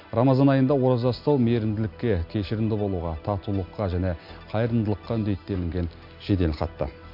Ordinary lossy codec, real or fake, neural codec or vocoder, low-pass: MP3, 48 kbps; real; none; 5.4 kHz